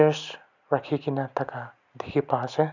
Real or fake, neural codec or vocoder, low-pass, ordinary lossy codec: real; none; 7.2 kHz; none